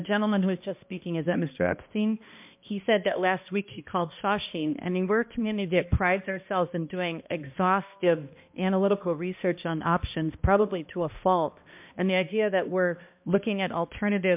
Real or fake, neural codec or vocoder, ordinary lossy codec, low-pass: fake; codec, 16 kHz, 1 kbps, X-Codec, HuBERT features, trained on balanced general audio; MP3, 32 kbps; 3.6 kHz